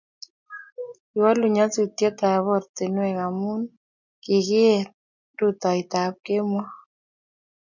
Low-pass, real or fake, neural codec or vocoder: 7.2 kHz; real; none